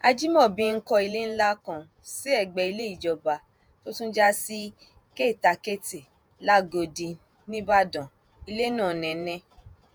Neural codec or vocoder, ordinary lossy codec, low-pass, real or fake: vocoder, 48 kHz, 128 mel bands, Vocos; none; none; fake